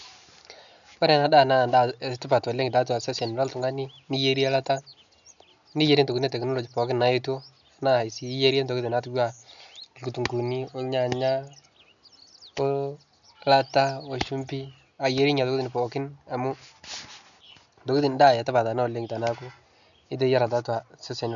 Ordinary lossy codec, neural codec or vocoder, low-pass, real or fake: none; none; 7.2 kHz; real